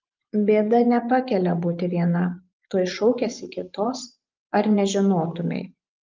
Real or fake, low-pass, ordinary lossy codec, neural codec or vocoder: fake; 7.2 kHz; Opus, 24 kbps; vocoder, 24 kHz, 100 mel bands, Vocos